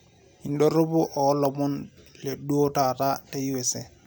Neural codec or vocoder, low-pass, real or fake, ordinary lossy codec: none; none; real; none